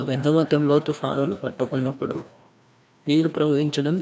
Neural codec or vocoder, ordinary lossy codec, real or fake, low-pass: codec, 16 kHz, 1 kbps, FreqCodec, larger model; none; fake; none